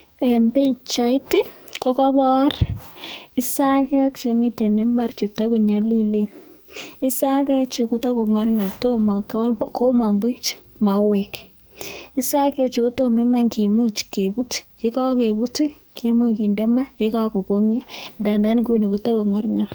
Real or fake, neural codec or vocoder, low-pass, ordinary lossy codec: fake; codec, 44.1 kHz, 2.6 kbps, SNAC; none; none